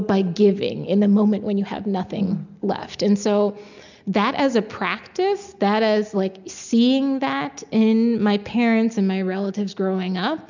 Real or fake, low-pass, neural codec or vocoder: real; 7.2 kHz; none